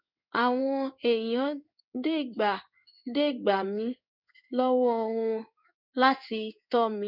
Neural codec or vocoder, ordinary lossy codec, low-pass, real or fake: codec, 16 kHz in and 24 kHz out, 1 kbps, XY-Tokenizer; none; 5.4 kHz; fake